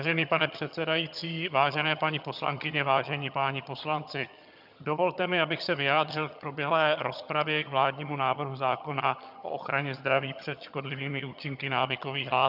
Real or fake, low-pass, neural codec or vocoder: fake; 5.4 kHz; vocoder, 22.05 kHz, 80 mel bands, HiFi-GAN